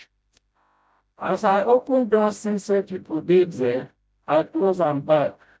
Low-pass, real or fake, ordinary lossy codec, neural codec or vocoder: none; fake; none; codec, 16 kHz, 0.5 kbps, FreqCodec, smaller model